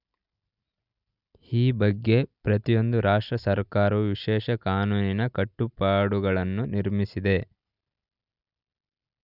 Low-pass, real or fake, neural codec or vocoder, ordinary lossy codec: 5.4 kHz; real; none; none